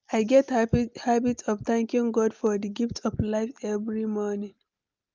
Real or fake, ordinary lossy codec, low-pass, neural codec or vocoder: real; Opus, 24 kbps; 7.2 kHz; none